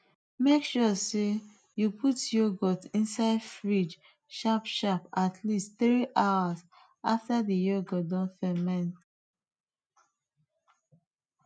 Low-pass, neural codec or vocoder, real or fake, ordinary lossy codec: 9.9 kHz; none; real; none